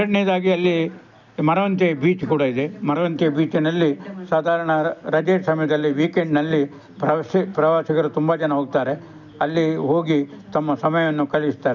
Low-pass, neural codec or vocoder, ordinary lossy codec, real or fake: 7.2 kHz; none; none; real